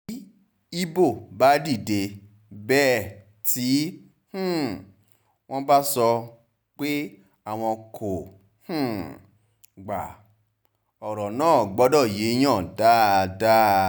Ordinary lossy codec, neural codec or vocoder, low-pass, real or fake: none; none; none; real